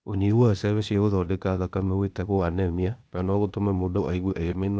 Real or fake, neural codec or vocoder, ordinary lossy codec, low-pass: fake; codec, 16 kHz, 0.8 kbps, ZipCodec; none; none